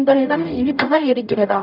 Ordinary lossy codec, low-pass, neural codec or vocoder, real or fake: none; 5.4 kHz; codec, 44.1 kHz, 0.9 kbps, DAC; fake